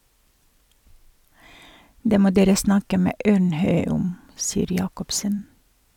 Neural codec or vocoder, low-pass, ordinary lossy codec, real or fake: vocoder, 44.1 kHz, 128 mel bands every 256 samples, BigVGAN v2; 19.8 kHz; none; fake